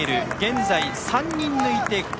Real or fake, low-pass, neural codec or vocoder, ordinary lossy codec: real; none; none; none